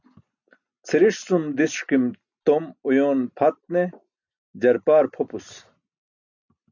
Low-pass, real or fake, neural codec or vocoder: 7.2 kHz; real; none